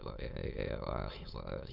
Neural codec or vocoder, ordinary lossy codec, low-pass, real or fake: autoencoder, 22.05 kHz, a latent of 192 numbers a frame, VITS, trained on many speakers; Opus, 64 kbps; 5.4 kHz; fake